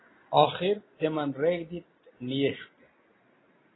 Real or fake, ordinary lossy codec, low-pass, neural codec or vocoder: real; AAC, 16 kbps; 7.2 kHz; none